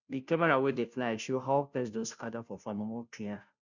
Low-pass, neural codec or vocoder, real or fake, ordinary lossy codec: 7.2 kHz; codec, 16 kHz, 0.5 kbps, FunCodec, trained on Chinese and English, 25 frames a second; fake; none